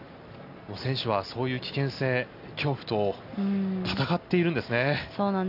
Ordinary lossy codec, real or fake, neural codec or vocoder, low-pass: none; real; none; 5.4 kHz